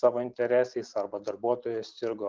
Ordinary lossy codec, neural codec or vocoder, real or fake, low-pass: Opus, 24 kbps; none; real; 7.2 kHz